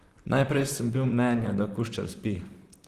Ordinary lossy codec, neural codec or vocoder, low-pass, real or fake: Opus, 24 kbps; vocoder, 44.1 kHz, 128 mel bands, Pupu-Vocoder; 14.4 kHz; fake